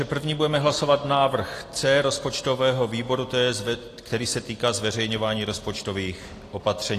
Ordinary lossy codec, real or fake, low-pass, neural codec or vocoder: AAC, 48 kbps; real; 14.4 kHz; none